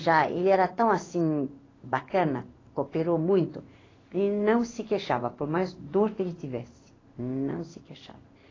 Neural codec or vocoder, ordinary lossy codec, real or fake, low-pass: codec, 16 kHz in and 24 kHz out, 1 kbps, XY-Tokenizer; AAC, 32 kbps; fake; 7.2 kHz